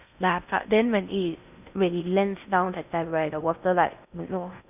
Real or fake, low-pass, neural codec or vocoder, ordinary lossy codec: fake; 3.6 kHz; codec, 16 kHz in and 24 kHz out, 0.6 kbps, FocalCodec, streaming, 2048 codes; none